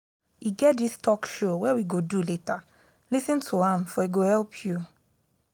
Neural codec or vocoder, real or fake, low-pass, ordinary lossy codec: none; real; none; none